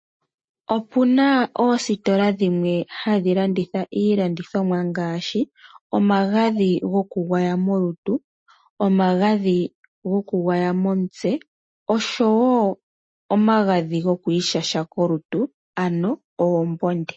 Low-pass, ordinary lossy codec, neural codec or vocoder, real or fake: 9.9 kHz; MP3, 32 kbps; none; real